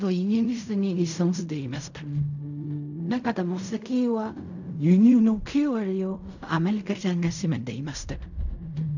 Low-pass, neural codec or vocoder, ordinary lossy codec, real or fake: 7.2 kHz; codec, 16 kHz in and 24 kHz out, 0.4 kbps, LongCat-Audio-Codec, fine tuned four codebook decoder; none; fake